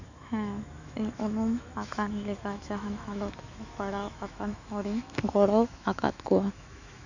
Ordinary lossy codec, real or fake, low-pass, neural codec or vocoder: none; fake; 7.2 kHz; autoencoder, 48 kHz, 128 numbers a frame, DAC-VAE, trained on Japanese speech